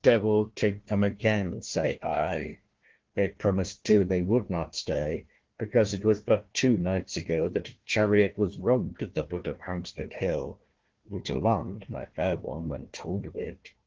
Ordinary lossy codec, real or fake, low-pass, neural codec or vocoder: Opus, 16 kbps; fake; 7.2 kHz; codec, 16 kHz, 1 kbps, FunCodec, trained on Chinese and English, 50 frames a second